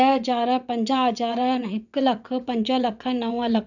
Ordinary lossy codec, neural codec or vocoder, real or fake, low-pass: none; vocoder, 22.05 kHz, 80 mel bands, WaveNeXt; fake; 7.2 kHz